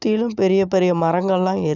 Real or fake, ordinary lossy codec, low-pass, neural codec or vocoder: real; none; 7.2 kHz; none